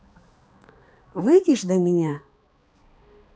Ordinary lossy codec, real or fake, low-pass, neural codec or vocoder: none; fake; none; codec, 16 kHz, 2 kbps, X-Codec, HuBERT features, trained on balanced general audio